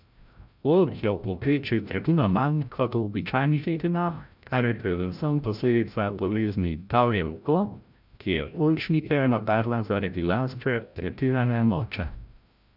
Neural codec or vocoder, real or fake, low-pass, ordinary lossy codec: codec, 16 kHz, 0.5 kbps, FreqCodec, larger model; fake; 5.4 kHz; none